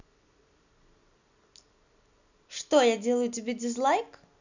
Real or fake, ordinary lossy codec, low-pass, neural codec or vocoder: real; none; 7.2 kHz; none